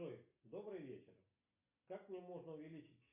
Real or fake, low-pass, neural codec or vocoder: real; 3.6 kHz; none